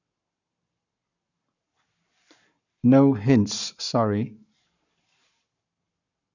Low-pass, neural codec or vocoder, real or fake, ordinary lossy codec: 7.2 kHz; codec, 44.1 kHz, 7.8 kbps, Pupu-Codec; fake; none